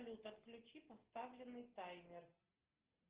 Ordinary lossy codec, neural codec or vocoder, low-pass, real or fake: Opus, 16 kbps; none; 3.6 kHz; real